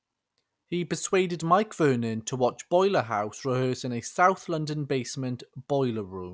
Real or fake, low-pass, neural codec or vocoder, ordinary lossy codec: real; none; none; none